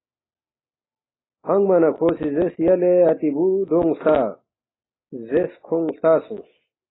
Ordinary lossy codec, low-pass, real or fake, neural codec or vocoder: AAC, 16 kbps; 7.2 kHz; real; none